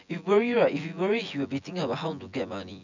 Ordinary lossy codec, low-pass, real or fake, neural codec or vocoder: none; 7.2 kHz; fake; vocoder, 24 kHz, 100 mel bands, Vocos